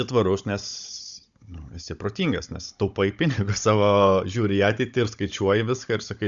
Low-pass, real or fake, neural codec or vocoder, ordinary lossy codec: 7.2 kHz; fake; codec, 16 kHz, 16 kbps, FunCodec, trained on Chinese and English, 50 frames a second; Opus, 64 kbps